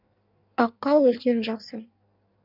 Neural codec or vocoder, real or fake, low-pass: codec, 16 kHz in and 24 kHz out, 1.1 kbps, FireRedTTS-2 codec; fake; 5.4 kHz